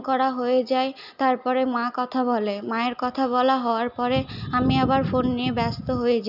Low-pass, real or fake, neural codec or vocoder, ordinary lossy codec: 5.4 kHz; real; none; none